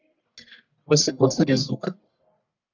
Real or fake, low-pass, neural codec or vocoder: fake; 7.2 kHz; codec, 44.1 kHz, 1.7 kbps, Pupu-Codec